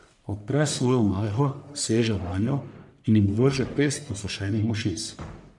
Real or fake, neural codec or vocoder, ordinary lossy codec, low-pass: fake; codec, 44.1 kHz, 1.7 kbps, Pupu-Codec; none; 10.8 kHz